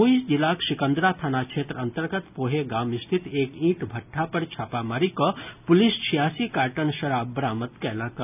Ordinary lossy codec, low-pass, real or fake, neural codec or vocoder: none; 3.6 kHz; real; none